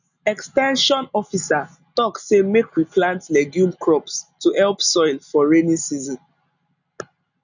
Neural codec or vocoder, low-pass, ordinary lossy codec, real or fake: none; 7.2 kHz; none; real